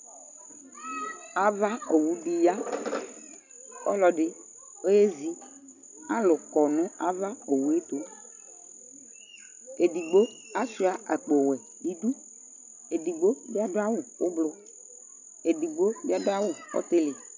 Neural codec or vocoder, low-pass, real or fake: none; 7.2 kHz; real